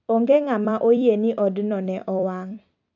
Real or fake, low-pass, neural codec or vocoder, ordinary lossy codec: fake; 7.2 kHz; vocoder, 44.1 kHz, 128 mel bands every 256 samples, BigVGAN v2; AAC, 48 kbps